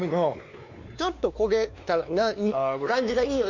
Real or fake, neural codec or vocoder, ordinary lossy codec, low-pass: fake; codec, 16 kHz, 2 kbps, X-Codec, WavLM features, trained on Multilingual LibriSpeech; none; 7.2 kHz